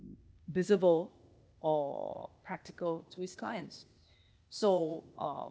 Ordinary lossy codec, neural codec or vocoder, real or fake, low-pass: none; codec, 16 kHz, 0.8 kbps, ZipCodec; fake; none